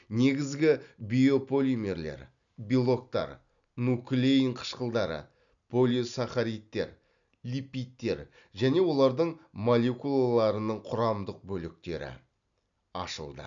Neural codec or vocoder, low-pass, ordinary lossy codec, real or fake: none; 7.2 kHz; none; real